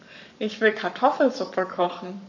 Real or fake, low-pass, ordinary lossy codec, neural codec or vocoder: fake; 7.2 kHz; none; codec, 44.1 kHz, 7.8 kbps, Pupu-Codec